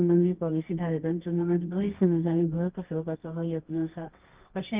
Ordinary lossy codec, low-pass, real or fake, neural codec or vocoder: Opus, 32 kbps; 3.6 kHz; fake; codec, 24 kHz, 0.9 kbps, WavTokenizer, medium music audio release